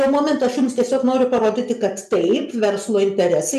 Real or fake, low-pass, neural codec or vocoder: real; 14.4 kHz; none